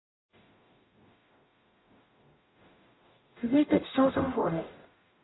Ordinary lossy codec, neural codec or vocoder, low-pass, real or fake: AAC, 16 kbps; codec, 44.1 kHz, 0.9 kbps, DAC; 7.2 kHz; fake